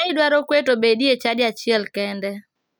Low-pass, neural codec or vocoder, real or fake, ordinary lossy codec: none; none; real; none